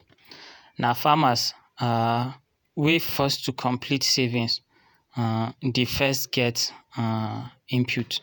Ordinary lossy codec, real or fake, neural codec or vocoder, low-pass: none; fake; vocoder, 48 kHz, 128 mel bands, Vocos; none